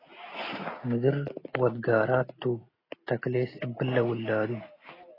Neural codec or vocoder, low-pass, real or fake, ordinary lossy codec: none; 5.4 kHz; real; AAC, 24 kbps